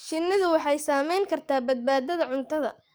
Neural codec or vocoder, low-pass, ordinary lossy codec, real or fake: codec, 44.1 kHz, 7.8 kbps, DAC; none; none; fake